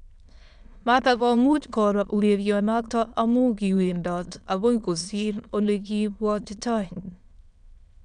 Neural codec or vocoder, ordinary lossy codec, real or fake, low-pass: autoencoder, 22.05 kHz, a latent of 192 numbers a frame, VITS, trained on many speakers; none; fake; 9.9 kHz